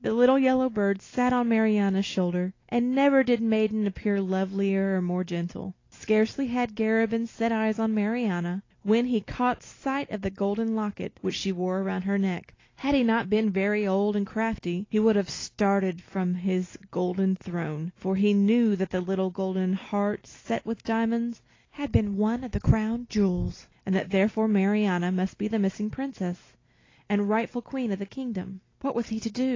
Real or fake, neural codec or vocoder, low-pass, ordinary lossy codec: real; none; 7.2 kHz; AAC, 32 kbps